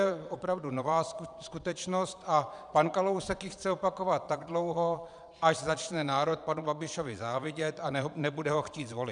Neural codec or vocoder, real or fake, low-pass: vocoder, 22.05 kHz, 80 mel bands, Vocos; fake; 9.9 kHz